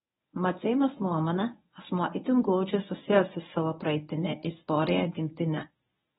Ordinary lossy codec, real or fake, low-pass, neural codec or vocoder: AAC, 16 kbps; fake; 10.8 kHz; codec, 24 kHz, 0.9 kbps, WavTokenizer, medium speech release version 1